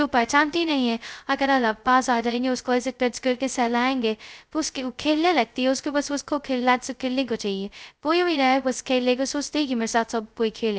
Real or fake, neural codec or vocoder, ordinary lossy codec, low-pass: fake; codec, 16 kHz, 0.2 kbps, FocalCodec; none; none